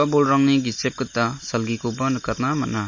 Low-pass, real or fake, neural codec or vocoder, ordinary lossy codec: 7.2 kHz; real; none; MP3, 32 kbps